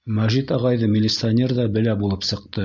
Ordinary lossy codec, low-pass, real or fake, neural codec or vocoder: Opus, 64 kbps; 7.2 kHz; real; none